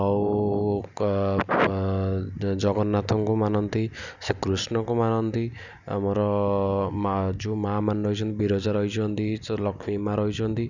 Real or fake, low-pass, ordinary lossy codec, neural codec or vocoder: real; 7.2 kHz; none; none